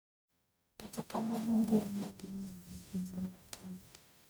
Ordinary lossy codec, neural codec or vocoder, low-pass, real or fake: none; codec, 44.1 kHz, 0.9 kbps, DAC; none; fake